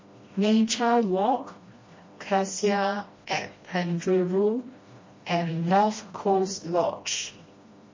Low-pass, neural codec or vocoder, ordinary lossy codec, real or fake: 7.2 kHz; codec, 16 kHz, 1 kbps, FreqCodec, smaller model; MP3, 32 kbps; fake